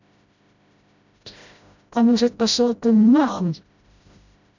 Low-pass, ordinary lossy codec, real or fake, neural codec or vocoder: 7.2 kHz; none; fake; codec, 16 kHz, 0.5 kbps, FreqCodec, smaller model